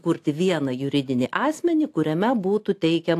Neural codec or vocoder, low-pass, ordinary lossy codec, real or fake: none; 14.4 kHz; AAC, 64 kbps; real